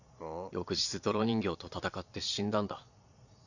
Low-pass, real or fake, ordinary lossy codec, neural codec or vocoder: 7.2 kHz; fake; none; vocoder, 22.05 kHz, 80 mel bands, Vocos